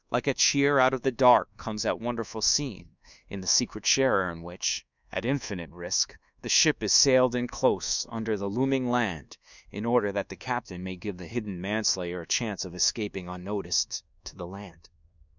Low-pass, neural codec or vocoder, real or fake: 7.2 kHz; codec, 24 kHz, 1.2 kbps, DualCodec; fake